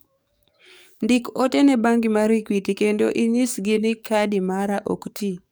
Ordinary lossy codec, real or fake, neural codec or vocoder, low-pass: none; fake; codec, 44.1 kHz, 7.8 kbps, DAC; none